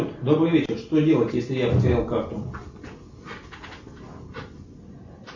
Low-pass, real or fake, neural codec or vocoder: 7.2 kHz; real; none